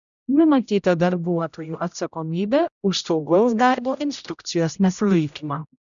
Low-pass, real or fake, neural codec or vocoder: 7.2 kHz; fake; codec, 16 kHz, 0.5 kbps, X-Codec, HuBERT features, trained on general audio